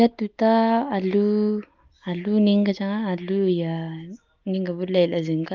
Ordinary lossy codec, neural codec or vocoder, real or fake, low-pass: Opus, 32 kbps; none; real; 7.2 kHz